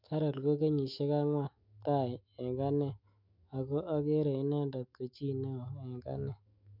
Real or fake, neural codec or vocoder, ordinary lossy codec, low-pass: fake; autoencoder, 48 kHz, 128 numbers a frame, DAC-VAE, trained on Japanese speech; MP3, 48 kbps; 5.4 kHz